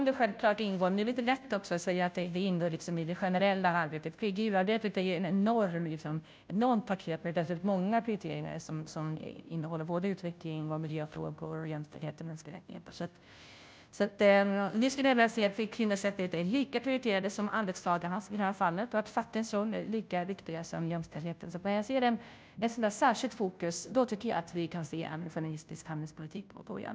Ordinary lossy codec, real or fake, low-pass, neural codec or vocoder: none; fake; none; codec, 16 kHz, 0.5 kbps, FunCodec, trained on Chinese and English, 25 frames a second